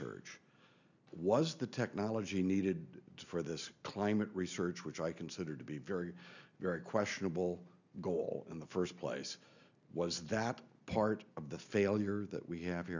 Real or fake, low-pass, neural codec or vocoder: real; 7.2 kHz; none